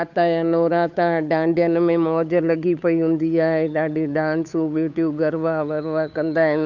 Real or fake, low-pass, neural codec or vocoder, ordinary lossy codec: fake; 7.2 kHz; codec, 16 kHz, 8 kbps, FunCodec, trained on Chinese and English, 25 frames a second; none